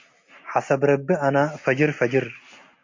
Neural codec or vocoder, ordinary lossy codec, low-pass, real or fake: none; MP3, 48 kbps; 7.2 kHz; real